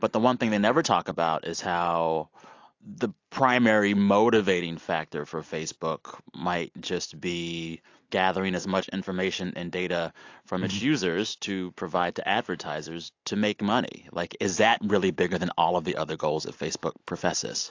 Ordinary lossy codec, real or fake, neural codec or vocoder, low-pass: AAC, 48 kbps; real; none; 7.2 kHz